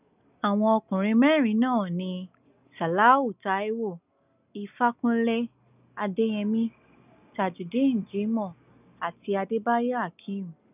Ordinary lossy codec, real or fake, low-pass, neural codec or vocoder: none; real; 3.6 kHz; none